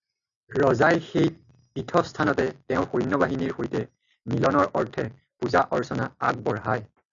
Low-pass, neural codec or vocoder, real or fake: 7.2 kHz; none; real